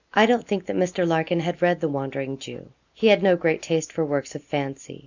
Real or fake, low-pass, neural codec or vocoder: real; 7.2 kHz; none